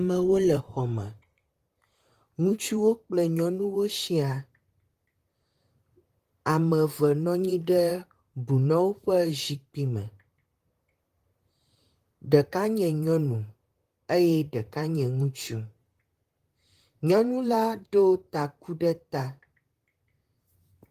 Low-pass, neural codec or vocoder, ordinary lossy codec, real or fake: 14.4 kHz; vocoder, 44.1 kHz, 128 mel bands, Pupu-Vocoder; Opus, 24 kbps; fake